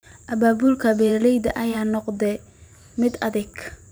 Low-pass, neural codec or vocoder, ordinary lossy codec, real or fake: none; vocoder, 44.1 kHz, 128 mel bands every 512 samples, BigVGAN v2; none; fake